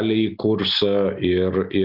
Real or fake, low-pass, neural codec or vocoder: real; 5.4 kHz; none